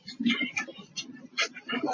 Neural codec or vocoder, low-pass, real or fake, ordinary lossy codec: none; 7.2 kHz; real; MP3, 32 kbps